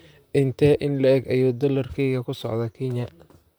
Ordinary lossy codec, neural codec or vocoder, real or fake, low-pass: none; vocoder, 44.1 kHz, 128 mel bands, Pupu-Vocoder; fake; none